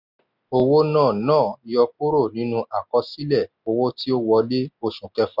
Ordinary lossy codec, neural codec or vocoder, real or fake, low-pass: none; none; real; 5.4 kHz